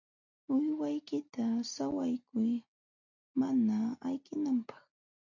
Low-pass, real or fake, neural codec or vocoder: 7.2 kHz; real; none